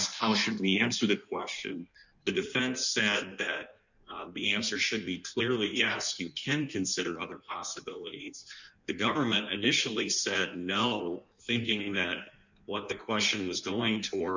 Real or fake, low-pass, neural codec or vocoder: fake; 7.2 kHz; codec, 16 kHz in and 24 kHz out, 1.1 kbps, FireRedTTS-2 codec